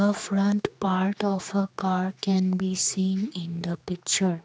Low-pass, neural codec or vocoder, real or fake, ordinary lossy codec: none; codec, 16 kHz, 2 kbps, X-Codec, HuBERT features, trained on general audio; fake; none